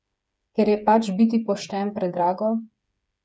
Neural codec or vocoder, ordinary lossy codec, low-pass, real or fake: codec, 16 kHz, 8 kbps, FreqCodec, smaller model; none; none; fake